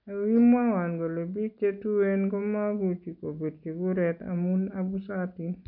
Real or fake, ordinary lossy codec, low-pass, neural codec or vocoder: real; none; 5.4 kHz; none